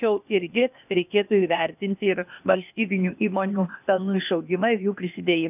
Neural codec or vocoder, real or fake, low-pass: codec, 16 kHz, 0.8 kbps, ZipCodec; fake; 3.6 kHz